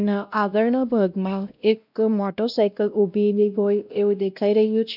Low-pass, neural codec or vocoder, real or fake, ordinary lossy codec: 5.4 kHz; codec, 16 kHz, 1 kbps, X-Codec, WavLM features, trained on Multilingual LibriSpeech; fake; none